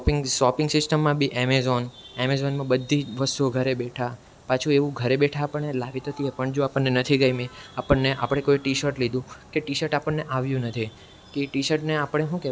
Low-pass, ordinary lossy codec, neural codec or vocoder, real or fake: none; none; none; real